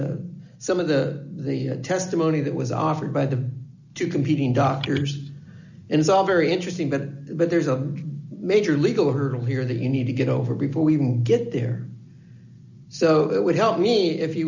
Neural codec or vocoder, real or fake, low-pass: none; real; 7.2 kHz